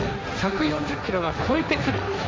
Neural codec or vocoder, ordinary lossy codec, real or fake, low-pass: codec, 16 kHz, 1.1 kbps, Voila-Tokenizer; none; fake; none